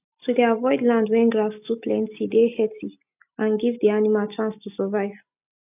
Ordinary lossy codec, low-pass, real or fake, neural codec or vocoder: none; 3.6 kHz; real; none